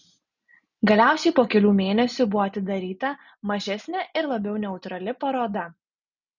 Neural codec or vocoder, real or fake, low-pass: none; real; 7.2 kHz